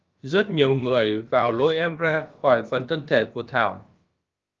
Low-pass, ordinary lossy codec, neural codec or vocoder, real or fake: 7.2 kHz; Opus, 32 kbps; codec, 16 kHz, about 1 kbps, DyCAST, with the encoder's durations; fake